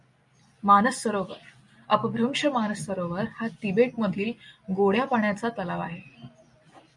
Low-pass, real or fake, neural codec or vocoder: 10.8 kHz; real; none